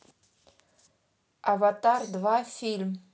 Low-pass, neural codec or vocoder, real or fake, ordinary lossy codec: none; none; real; none